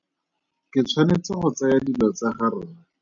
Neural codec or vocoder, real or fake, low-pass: none; real; 7.2 kHz